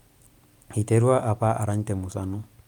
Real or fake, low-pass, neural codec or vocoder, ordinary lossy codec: real; 19.8 kHz; none; Opus, 32 kbps